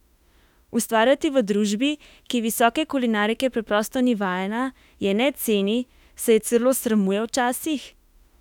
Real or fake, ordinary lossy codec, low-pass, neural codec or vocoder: fake; none; 19.8 kHz; autoencoder, 48 kHz, 32 numbers a frame, DAC-VAE, trained on Japanese speech